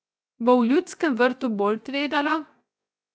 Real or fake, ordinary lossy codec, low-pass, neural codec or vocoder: fake; none; none; codec, 16 kHz, 0.7 kbps, FocalCodec